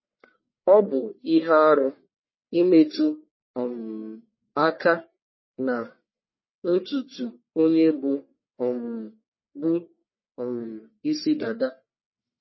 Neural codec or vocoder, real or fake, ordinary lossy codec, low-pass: codec, 44.1 kHz, 1.7 kbps, Pupu-Codec; fake; MP3, 24 kbps; 7.2 kHz